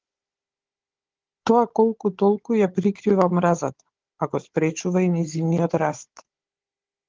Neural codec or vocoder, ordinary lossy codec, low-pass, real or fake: codec, 16 kHz, 16 kbps, FunCodec, trained on Chinese and English, 50 frames a second; Opus, 16 kbps; 7.2 kHz; fake